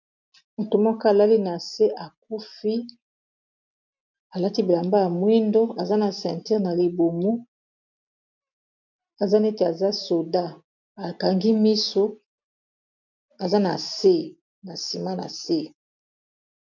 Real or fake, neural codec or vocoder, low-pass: real; none; 7.2 kHz